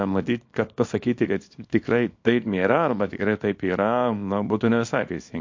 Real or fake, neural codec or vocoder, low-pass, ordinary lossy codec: fake; codec, 24 kHz, 0.9 kbps, WavTokenizer, small release; 7.2 kHz; MP3, 48 kbps